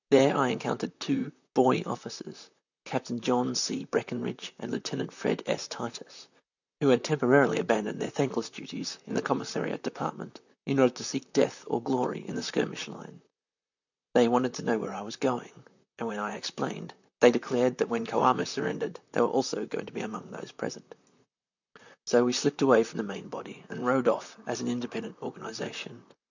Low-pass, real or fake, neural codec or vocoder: 7.2 kHz; fake; vocoder, 44.1 kHz, 128 mel bands, Pupu-Vocoder